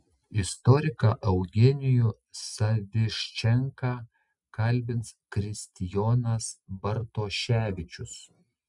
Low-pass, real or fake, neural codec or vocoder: 10.8 kHz; real; none